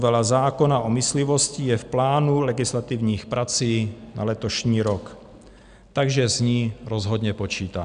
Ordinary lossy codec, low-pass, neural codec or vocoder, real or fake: MP3, 96 kbps; 9.9 kHz; none; real